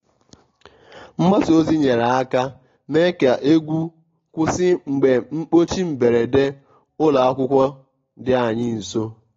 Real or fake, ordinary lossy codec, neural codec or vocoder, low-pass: real; AAC, 32 kbps; none; 7.2 kHz